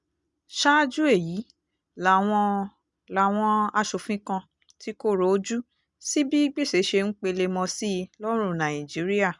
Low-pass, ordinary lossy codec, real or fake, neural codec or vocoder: 10.8 kHz; none; real; none